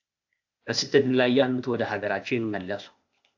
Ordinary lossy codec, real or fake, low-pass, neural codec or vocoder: AAC, 48 kbps; fake; 7.2 kHz; codec, 16 kHz, 0.8 kbps, ZipCodec